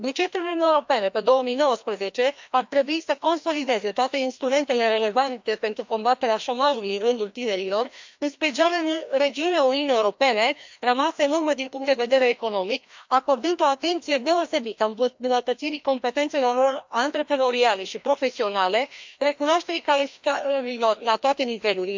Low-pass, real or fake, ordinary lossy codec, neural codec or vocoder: 7.2 kHz; fake; MP3, 64 kbps; codec, 16 kHz, 1 kbps, FreqCodec, larger model